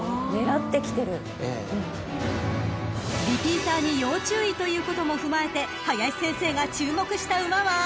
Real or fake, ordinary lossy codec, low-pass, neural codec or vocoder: real; none; none; none